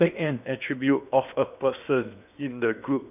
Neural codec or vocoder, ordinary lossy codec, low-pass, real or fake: codec, 16 kHz in and 24 kHz out, 0.8 kbps, FocalCodec, streaming, 65536 codes; none; 3.6 kHz; fake